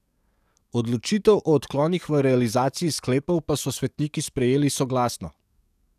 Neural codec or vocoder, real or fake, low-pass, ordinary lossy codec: codec, 44.1 kHz, 7.8 kbps, DAC; fake; 14.4 kHz; none